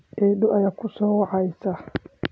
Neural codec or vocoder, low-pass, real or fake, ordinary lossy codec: none; none; real; none